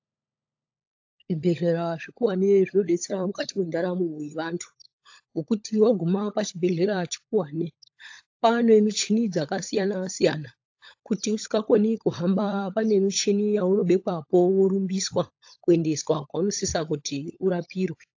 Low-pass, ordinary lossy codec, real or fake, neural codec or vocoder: 7.2 kHz; AAC, 48 kbps; fake; codec, 16 kHz, 16 kbps, FunCodec, trained on LibriTTS, 50 frames a second